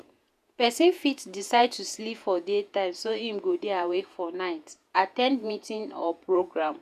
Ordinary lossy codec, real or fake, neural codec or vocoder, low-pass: none; fake; vocoder, 48 kHz, 128 mel bands, Vocos; 14.4 kHz